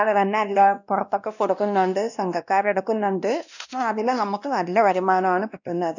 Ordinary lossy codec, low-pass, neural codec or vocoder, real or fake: none; 7.2 kHz; codec, 16 kHz, 1 kbps, X-Codec, WavLM features, trained on Multilingual LibriSpeech; fake